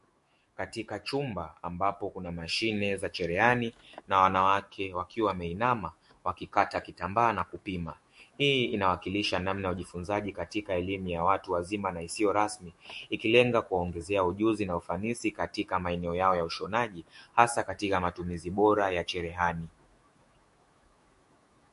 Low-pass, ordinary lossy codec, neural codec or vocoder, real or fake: 14.4 kHz; MP3, 48 kbps; autoencoder, 48 kHz, 128 numbers a frame, DAC-VAE, trained on Japanese speech; fake